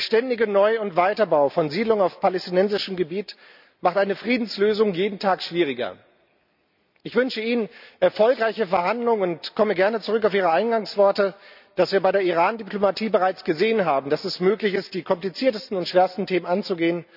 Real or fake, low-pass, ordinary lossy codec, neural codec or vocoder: real; 5.4 kHz; none; none